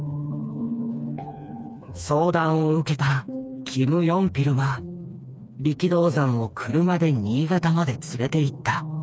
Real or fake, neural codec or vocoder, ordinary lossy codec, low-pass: fake; codec, 16 kHz, 2 kbps, FreqCodec, smaller model; none; none